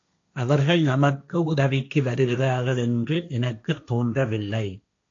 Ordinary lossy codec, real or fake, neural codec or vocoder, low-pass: AAC, 48 kbps; fake; codec, 16 kHz, 1.1 kbps, Voila-Tokenizer; 7.2 kHz